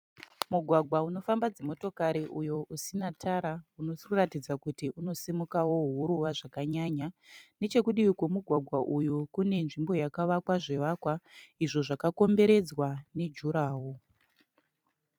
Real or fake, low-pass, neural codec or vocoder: fake; 19.8 kHz; vocoder, 44.1 kHz, 128 mel bands every 256 samples, BigVGAN v2